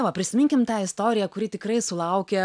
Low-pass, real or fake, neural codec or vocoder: 9.9 kHz; real; none